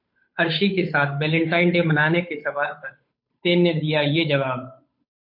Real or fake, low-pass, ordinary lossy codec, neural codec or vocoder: fake; 5.4 kHz; MP3, 32 kbps; codec, 16 kHz, 8 kbps, FunCodec, trained on Chinese and English, 25 frames a second